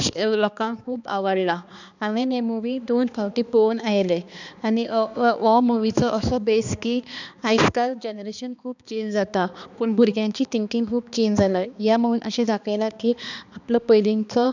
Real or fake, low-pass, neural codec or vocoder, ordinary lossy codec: fake; 7.2 kHz; codec, 16 kHz, 2 kbps, X-Codec, HuBERT features, trained on balanced general audio; none